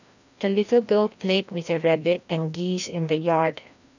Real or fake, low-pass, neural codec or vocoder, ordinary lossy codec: fake; 7.2 kHz; codec, 16 kHz, 1 kbps, FreqCodec, larger model; none